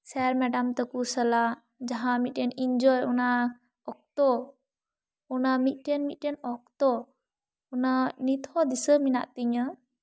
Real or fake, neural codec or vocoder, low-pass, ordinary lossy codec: real; none; none; none